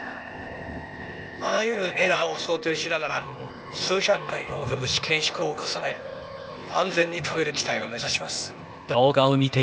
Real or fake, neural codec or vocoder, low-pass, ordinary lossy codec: fake; codec, 16 kHz, 0.8 kbps, ZipCodec; none; none